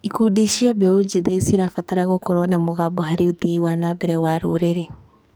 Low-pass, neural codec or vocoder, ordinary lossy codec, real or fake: none; codec, 44.1 kHz, 2.6 kbps, SNAC; none; fake